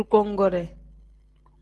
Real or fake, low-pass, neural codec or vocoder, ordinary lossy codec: real; 10.8 kHz; none; Opus, 16 kbps